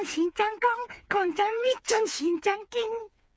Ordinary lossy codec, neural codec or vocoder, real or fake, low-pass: none; codec, 16 kHz, 4 kbps, FreqCodec, smaller model; fake; none